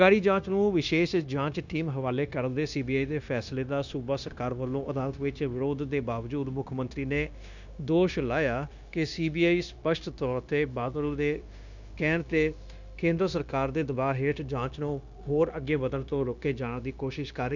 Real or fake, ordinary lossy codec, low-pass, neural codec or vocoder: fake; none; 7.2 kHz; codec, 16 kHz, 0.9 kbps, LongCat-Audio-Codec